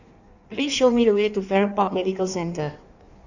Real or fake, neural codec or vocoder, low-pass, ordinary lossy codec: fake; codec, 16 kHz in and 24 kHz out, 1.1 kbps, FireRedTTS-2 codec; 7.2 kHz; none